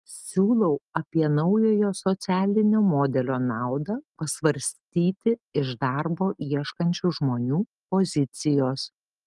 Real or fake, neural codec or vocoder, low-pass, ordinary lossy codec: real; none; 10.8 kHz; Opus, 32 kbps